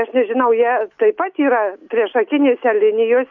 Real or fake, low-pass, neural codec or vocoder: real; 7.2 kHz; none